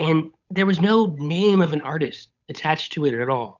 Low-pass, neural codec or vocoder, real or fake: 7.2 kHz; codec, 16 kHz, 8 kbps, FunCodec, trained on Chinese and English, 25 frames a second; fake